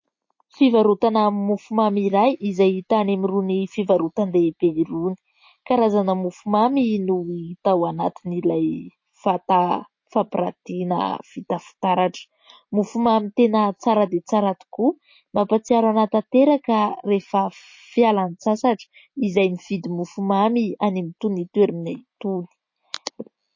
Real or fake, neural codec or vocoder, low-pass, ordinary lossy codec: real; none; 7.2 kHz; MP3, 32 kbps